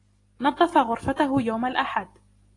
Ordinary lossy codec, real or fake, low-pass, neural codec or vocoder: AAC, 32 kbps; real; 10.8 kHz; none